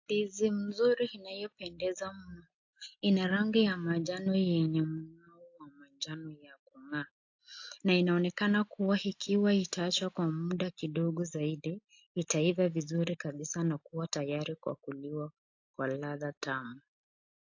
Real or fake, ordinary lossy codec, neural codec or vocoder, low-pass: real; AAC, 48 kbps; none; 7.2 kHz